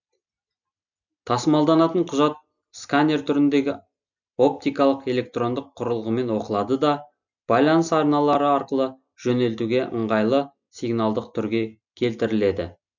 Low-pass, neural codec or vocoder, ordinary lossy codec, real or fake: 7.2 kHz; none; none; real